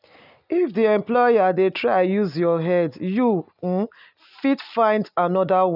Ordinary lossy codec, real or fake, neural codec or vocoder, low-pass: none; real; none; 5.4 kHz